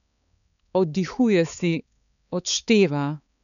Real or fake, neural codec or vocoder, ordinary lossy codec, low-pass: fake; codec, 16 kHz, 4 kbps, X-Codec, HuBERT features, trained on balanced general audio; none; 7.2 kHz